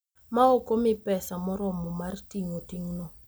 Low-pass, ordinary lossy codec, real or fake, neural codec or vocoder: none; none; real; none